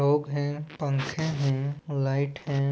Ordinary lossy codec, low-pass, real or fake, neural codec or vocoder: none; none; real; none